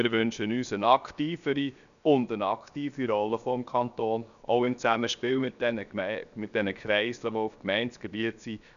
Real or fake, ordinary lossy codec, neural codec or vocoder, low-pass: fake; none; codec, 16 kHz, 0.7 kbps, FocalCodec; 7.2 kHz